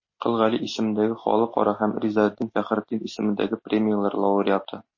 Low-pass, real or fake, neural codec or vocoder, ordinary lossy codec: 7.2 kHz; real; none; MP3, 32 kbps